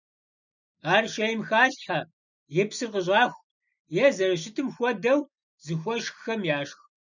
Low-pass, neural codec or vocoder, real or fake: 7.2 kHz; none; real